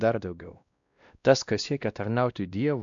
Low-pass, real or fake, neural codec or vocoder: 7.2 kHz; fake; codec, 16 kHz, 1 kbps, X-Codec, WavLM features, trained on Multilingual LibriSpeech